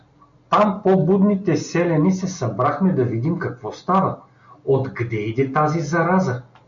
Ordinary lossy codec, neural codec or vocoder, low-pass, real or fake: MP3, 64 kbps; none; 7.2 kHz; real